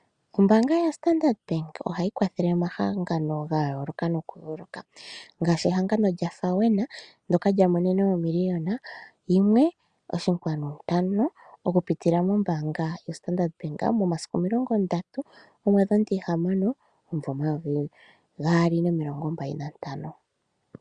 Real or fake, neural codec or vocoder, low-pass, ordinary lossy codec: real; none; 9.9 kHz; Opus, 64 kbps